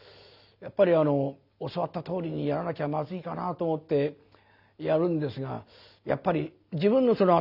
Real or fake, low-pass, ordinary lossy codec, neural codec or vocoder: real; 5.4 kHz; none; none